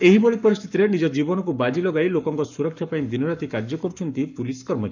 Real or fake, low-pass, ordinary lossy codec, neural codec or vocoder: fake; 7.2 kHz; none; codec, 44.1 kHz, 7.8 kbps, Pupu-Codec